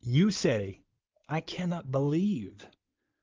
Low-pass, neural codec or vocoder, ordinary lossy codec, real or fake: 7.2 kHz; codec, 16 kHz in and 24 kHz out, 2.2 kbps, FireRedTTS-2 codec; Opus, 32 kbps; fake